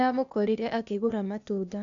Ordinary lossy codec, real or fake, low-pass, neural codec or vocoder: none; fake; 7.2 kHz; codec, 16 kHz, 0.8 kbps, ZipCodec